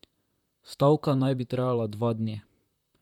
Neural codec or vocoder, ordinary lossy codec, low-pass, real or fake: vocoder, 44.1 kHz, 128 mel bands, Pupu-Vocoder; none; 19.8 kHz; fake